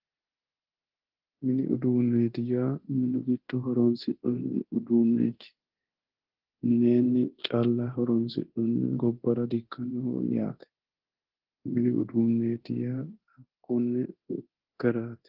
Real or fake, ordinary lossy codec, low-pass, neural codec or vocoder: fake; Opus, 16 kbps; 5.4 kHz; codec, 24 kHz, 0.9 kbps, DualCodec